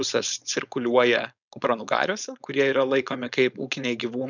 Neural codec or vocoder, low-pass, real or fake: codec, 16 kHz, 4.8 kbps, FACodec; 7.2 kHz; fake